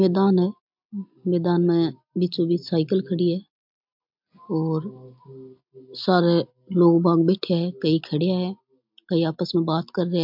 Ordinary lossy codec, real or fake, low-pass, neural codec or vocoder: MP3, 48 kbps; real; 5.4 kHz; none